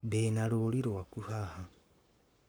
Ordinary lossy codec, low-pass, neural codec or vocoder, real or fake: none; none; codec, 44.1 kHz, 7.8 kbps, Pupu-Codec; fake